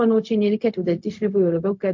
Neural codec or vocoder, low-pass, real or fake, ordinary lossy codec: codec, 16 kHz, 0.4 kbps, LongCat-Audio-Codec; 7.2 kHz; fake; MP3, 48 kbps